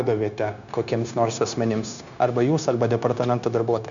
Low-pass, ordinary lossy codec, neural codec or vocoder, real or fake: 7.2 kHz; MP3, 96 kbps; codec, 16 kHz, 0.9 kbps, LongCat-Audio-Codec; fake